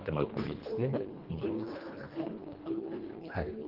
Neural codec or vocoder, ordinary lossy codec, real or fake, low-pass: codec, 24 kHz, 1.5 kbps, HILCodec; Opus, 16 kbps; fake; 5.4 kHz